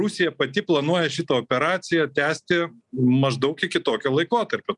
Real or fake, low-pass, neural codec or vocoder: real; 10.8 kHz; none